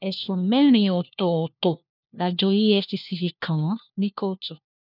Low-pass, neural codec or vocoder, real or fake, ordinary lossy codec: 5.4 kHz; codec, 16 kHz, 1 kbps, FunCodec, trained on LibriTTS, 50 frames a second; fake; none